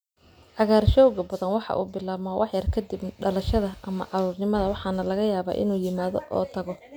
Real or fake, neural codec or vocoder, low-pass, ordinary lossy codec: real; none; none; none